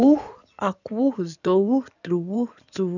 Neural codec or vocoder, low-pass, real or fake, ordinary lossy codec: codec, 16 kHz in and 24 kHz out, 2.2 kbps, FireRedTTS-2 codec; 7.2 kHz; fake; none